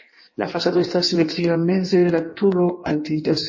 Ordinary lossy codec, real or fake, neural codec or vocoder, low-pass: MP3, 32 kbps; fake; codec, 16 kHz in and 24 kHz out, 1.1 kbps, FireRedTTS-2 codec; 7.2 kHz